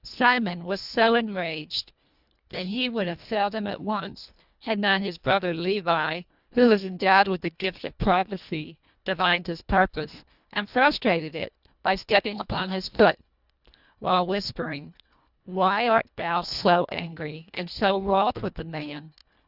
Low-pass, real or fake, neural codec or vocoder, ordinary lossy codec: 5.4 kHz; fake; codec, 24 kHz, 1.5 kbps, HILCodec; Opus, 64 kbps